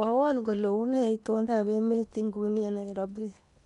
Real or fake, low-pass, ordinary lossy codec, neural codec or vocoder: fake; 10.8 kHz; none; codec, 16 kHz in and 24 kHz out, 0.8 kbps, FocalCodec, streaming, 65536 codes